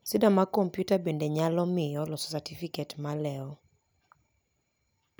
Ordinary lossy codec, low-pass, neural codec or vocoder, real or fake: none; none; none; real